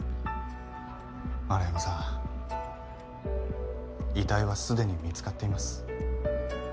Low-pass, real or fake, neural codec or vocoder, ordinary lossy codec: none; real; none; none